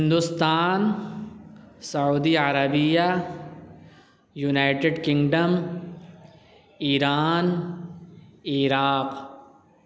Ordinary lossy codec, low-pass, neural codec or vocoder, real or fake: none; none; none; real